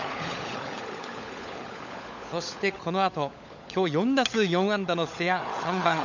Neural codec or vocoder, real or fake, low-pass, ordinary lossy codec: codec, 16 kHz, 16 kbps, FunCodec, trained on LibriTTS, 50 frames a second; fake; 7.2 kHz; none